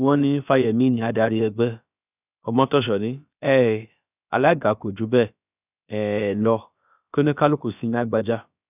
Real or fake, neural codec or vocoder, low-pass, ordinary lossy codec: fake; codec, 16 kHz, about 1 kbps, DyCAST, with the encoder's durations; 3.6 kHz; none